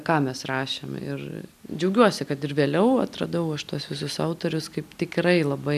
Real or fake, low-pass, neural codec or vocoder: real; 14.4 kHz; none